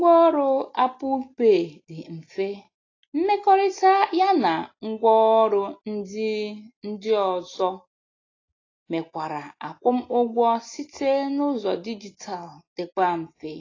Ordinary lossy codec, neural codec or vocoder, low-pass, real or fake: AAC, 32 kbps; none; 7.2 kHz; real